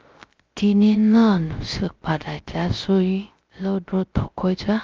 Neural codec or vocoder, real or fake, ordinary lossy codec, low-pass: codec, 16 kHz, 0.3 kbps, FocalCodec; fake; Opus, 24 kbps; 7.2 kHz